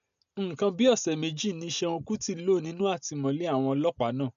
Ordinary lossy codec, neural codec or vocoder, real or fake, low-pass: none; none; real; 7.2 kHz